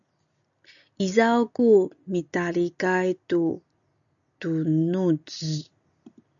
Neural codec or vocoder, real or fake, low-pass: none; real; 7.2 kHz